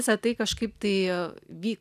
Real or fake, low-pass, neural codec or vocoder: real; 14.4 kHz; none